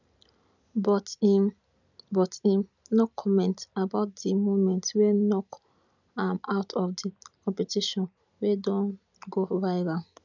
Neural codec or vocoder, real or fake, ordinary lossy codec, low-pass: none; real; none; 7.2 kHz